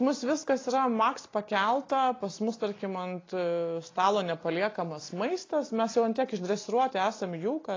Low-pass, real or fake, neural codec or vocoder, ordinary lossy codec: 7.2 kHz; real; none; AAC, 32 kbps